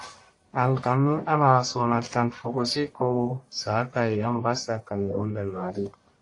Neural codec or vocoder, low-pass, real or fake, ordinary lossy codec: codec, 44.1 kHz, 1.7 kbps, Pupu-Codec; 10.8 kHz; fake; AAC, 48 kbps